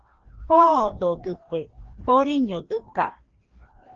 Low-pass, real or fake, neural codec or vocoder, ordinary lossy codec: 7.2 kHz; fake; codec, 16 kHz, 1 kbps, FreqCodec, larger model; Opus, 16 kbps